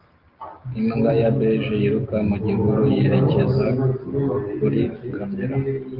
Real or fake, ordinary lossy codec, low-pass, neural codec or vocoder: real; Opus, 24 kbps; 5.4 kHz; none